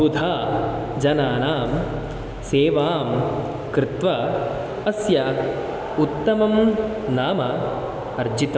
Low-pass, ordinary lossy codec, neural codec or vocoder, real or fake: none; none; none; real